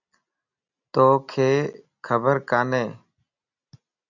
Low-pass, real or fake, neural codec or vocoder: 7.2 kHz; real; none